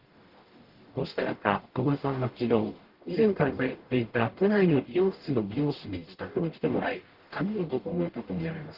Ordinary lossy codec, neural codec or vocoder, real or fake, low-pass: Opus, 16 kbps; codec, 44.1 kHz, 0.9 kbps, DAC; fake; 5.4 kHz